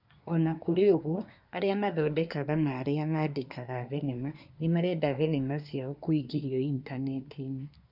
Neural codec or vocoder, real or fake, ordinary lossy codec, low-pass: codec, 24 kHz, 1 kbps, SNAC; fake; none; 5.4 kHz